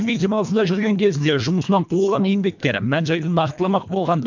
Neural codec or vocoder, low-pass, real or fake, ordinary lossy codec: codec, 24 kHz, 1.5 kbps, HILCodec; 7.2 kHz; fake; MP3, 64 kbps